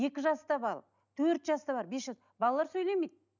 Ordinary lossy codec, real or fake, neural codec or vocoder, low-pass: none; real; none; 7.2 kHz